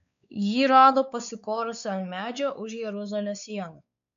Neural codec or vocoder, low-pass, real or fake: codec, 16 kHz, 4 kbps, X-Codec, WavLM features, trained on Multilingual LibriSpeech; 7.2 kHz; fake